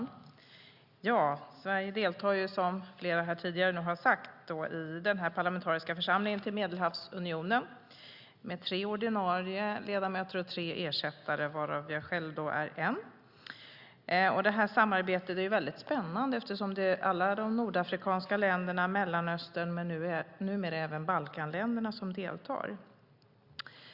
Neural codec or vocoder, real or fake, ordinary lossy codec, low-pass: none; real; Opus, 64 kbps; 5.4 kHz